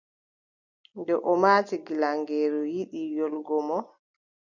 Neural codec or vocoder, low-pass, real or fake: none; 7.2 kHz; real